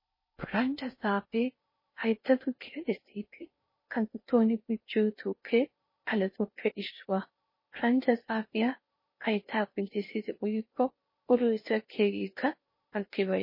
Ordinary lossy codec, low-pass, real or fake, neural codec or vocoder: MP3, 24 kbps; 5.4 kHz; fake; codec, 16 kHz in and 24 kHz out, 0.6 kbps, FocalCodec, streaming, 4096 codes